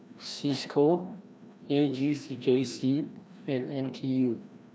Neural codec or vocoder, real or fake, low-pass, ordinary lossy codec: codec, 16 kHz, 1 kbps, FreqCodec, larger model; fake; none; none